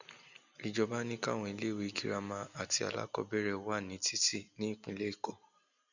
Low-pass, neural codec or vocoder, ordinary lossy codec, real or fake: 7.2 kHz; none; none; real